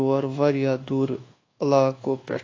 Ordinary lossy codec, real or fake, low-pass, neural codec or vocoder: AAC, 32 kbps; fake; 7.2 kHz; codec, 24 kHz, 1.2 kbps, DualCodec